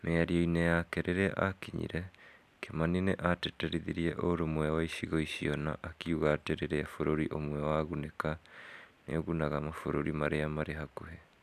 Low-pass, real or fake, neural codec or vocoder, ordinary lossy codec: 14.4 kHz; real; none; none